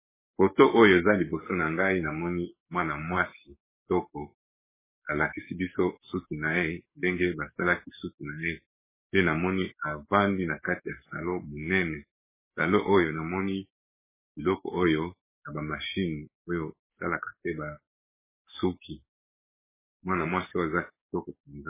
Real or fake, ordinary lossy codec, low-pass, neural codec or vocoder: fake; MP3, 16 kbps; 3.6 kHz; codec, 44.1 kHz, 7.8 kbps, DAC